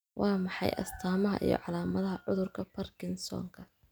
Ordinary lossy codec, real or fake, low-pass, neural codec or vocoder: none; real; none; none